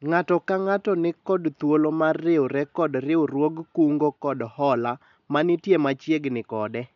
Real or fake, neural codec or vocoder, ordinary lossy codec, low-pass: real; none; none; 7.2 kHz